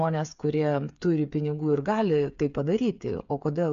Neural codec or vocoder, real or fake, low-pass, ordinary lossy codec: codec, 16 kHz, 8 kbps, FreqCodec, smaller model; fake; 7.2 kHz; AAC, 64 kbps